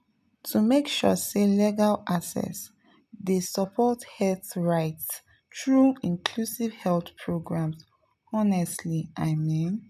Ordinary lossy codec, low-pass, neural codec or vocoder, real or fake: none; 14.4 kHz; none; real